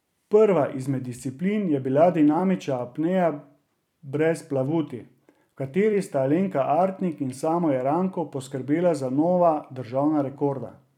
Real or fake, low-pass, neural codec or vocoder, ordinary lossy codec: fake; 19.8 kHz; vocoder, 44.1 kHz, 128 mel bands every 256 samples, BigVGAN v2; none